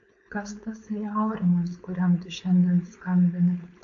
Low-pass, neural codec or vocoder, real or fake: 7.2 kHz; codec, 16 kHz, 4.8 kbps, FACodec; fake